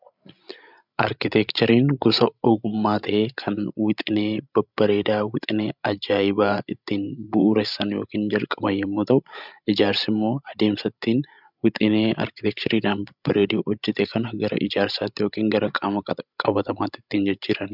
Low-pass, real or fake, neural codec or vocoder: 5.4 kHz; fake; codec, 16 kHz, 16 kbps, FreqCodec, larger model